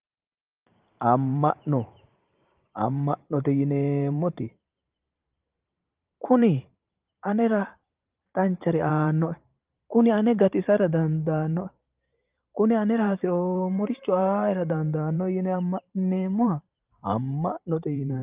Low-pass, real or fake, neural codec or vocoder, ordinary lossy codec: 3.6 kHz; fake; vocoder, 44.1 kHz, 128 mel bands every 512 samples, BigVGAN v2; Opus, 32 kbps